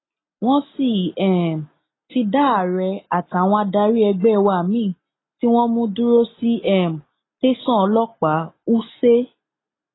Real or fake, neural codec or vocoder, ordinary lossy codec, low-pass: real; none; AAC, 16 kbps; 7.2 kHz